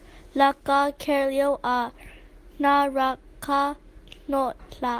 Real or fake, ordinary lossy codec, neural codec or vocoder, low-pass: real; Opus, 24 kbps; none; 14.4 kHz